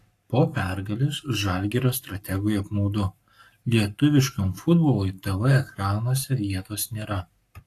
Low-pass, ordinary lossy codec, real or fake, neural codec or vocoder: 14.4 kHz; AAC, 64 kbps; fake; codec, 44.1 kHz, 7.8 kbps, Pupu-Codec